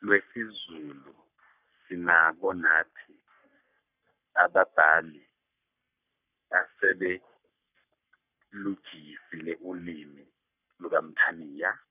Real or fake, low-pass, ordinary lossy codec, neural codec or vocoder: fake; 3.6 kHz; none; codec, 44.1 kHz, 3.4 kbps, Pupu-Codec